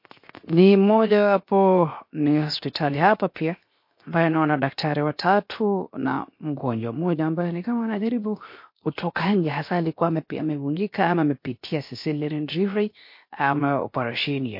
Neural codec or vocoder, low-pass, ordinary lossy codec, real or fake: codec, 16 kHz, 0.7 kbps, FocalCodec; 5.4 kHz; MP3, 32 kbps; fake